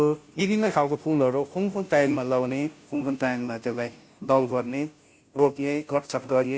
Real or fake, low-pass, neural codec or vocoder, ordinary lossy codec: fake; none; codec, 16 kHz, 0.5 kbps, FunCodec, trained on Chinese and English, 25 frames a second; none